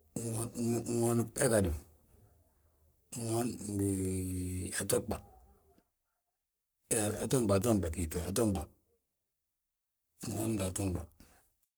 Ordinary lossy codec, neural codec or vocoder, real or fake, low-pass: none; codec, 44.1 kHz, 3.4 kbps, Pupu-Codec; fake; none